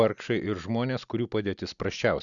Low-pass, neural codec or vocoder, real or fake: 7.2 kHz; none; real